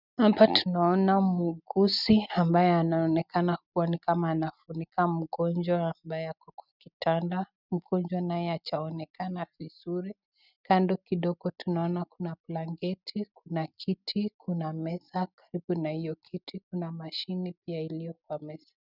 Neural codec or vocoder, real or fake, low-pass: none; real; 5.4 kHz